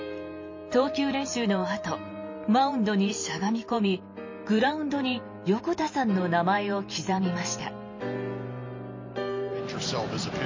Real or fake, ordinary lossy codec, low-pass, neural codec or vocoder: fake; MP3, 32 kbps; 7.2 kHz; vocoder, 44.1 kHz, 128 mel bands every 512 samples, BigVGAN v2